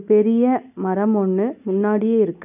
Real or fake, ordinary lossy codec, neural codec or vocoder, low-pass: real; none; none; 3.6 kHz